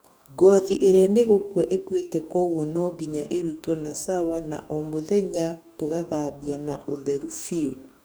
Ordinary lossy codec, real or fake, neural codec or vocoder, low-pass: none; fake; codec, 44.1 kHz, 2.6 kbps, DAC; none